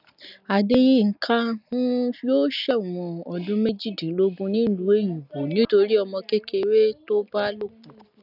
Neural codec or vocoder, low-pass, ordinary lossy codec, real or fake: none; 5.4 kHz; none; real